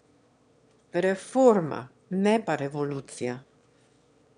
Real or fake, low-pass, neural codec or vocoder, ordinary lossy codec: fake; 9.9 kHz; autoencoder, 22.05 kHz, a latent of 192 numbers a frame, VITS, trained on one speaker; none